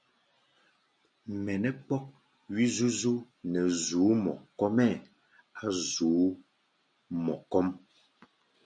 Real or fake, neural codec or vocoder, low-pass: real; none; 9.9 kHz